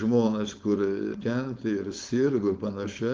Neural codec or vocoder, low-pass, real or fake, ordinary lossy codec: codec, 16 kHz, 4.8 kbps, FACodec; 7.2 kHz; fake; Opus, 32 kbps